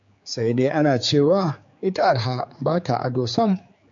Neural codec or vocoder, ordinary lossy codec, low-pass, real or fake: codec, 16 kHz, 4 kbps, X-Codec, HuBERT features, trained on general audio; MP3, 48 kbps; 7.2 kHz; fake